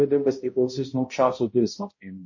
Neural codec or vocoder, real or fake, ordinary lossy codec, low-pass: codec, 16 kHz, 0.5 kbps, X-Codec, HuBERT features, trained on balanced general audio; fake; MP3, 32 kbps; 7.2 kHz